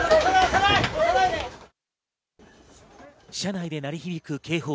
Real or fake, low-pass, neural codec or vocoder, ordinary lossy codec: real; none; none; none